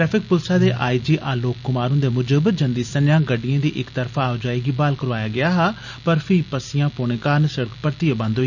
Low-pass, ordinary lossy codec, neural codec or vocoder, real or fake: 7.2 kHz; none; none; real